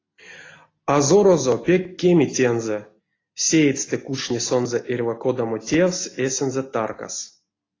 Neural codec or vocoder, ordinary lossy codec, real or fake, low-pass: none; AAC, 32 kbps; real; 7.2 kHz